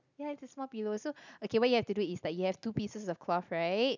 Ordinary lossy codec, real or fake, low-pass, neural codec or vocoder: none; real; 7.2 kHz; none